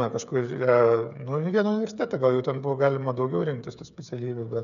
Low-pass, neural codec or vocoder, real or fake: 7.2 kHz; codec, 16 kHz, 8 kbps, FreqCodec, smaller model; fake